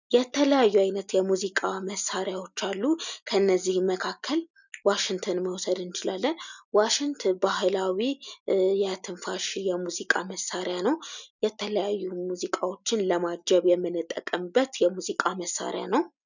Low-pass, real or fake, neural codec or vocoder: 7.2 kHz; real; none